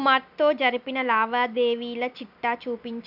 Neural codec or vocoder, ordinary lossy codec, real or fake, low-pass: none; none; real; 5.4 kHz